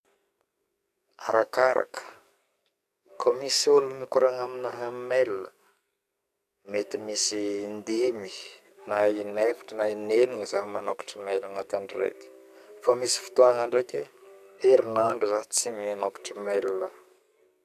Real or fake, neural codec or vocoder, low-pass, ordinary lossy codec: fake; codec, 44.1 kHz, 2.6 kbps, SNAC; 14.4 kHz; none